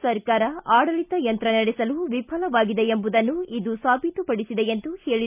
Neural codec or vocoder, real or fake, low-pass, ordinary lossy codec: none; real; 3.6 kHz; none